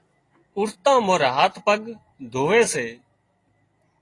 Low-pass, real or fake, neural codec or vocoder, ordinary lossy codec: 10.8 kHz; real; none; AAC, 32 kbps